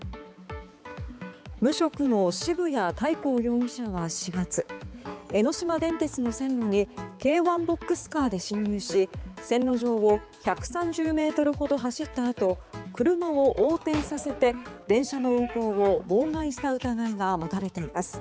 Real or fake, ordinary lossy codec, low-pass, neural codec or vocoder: fake; none; none; codec, 16 kHz, 4 kbps, X-Codec, HuBERT features, trained on balanced general audio